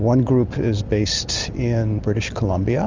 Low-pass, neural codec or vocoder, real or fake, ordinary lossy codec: 7.2 kHz; none; real; Opus, 32 kbps